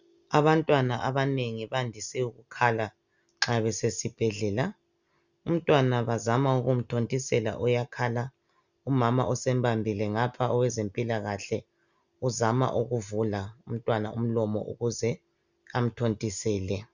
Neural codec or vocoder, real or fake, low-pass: none; real; 7.2 kHz